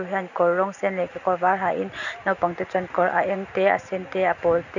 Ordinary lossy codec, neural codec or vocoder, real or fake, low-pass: none; none; real; 7.2 kHz